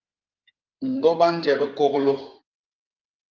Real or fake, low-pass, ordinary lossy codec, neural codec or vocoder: fake; 7.2 kHz; Opus, 32 kbps; codec, 16 kHz in and 24 kHz out, 2.2 kbps, FireRedTTS-2 codec